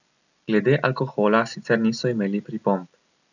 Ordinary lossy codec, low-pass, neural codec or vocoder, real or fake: none; 7.2 kHz; none; real